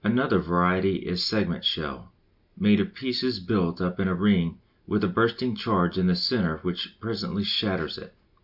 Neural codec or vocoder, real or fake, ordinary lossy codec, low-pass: none; real; Opus, 64 kbps; 5.4 kHz